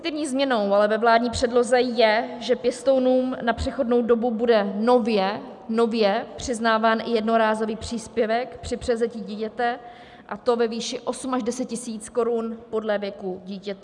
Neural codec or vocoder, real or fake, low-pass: none; real; 10.8 kHz